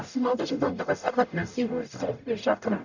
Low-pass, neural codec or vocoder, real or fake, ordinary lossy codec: 7.2 kHz; codec, 44.1 kHz, 0.9 kbps, DAC; fake; none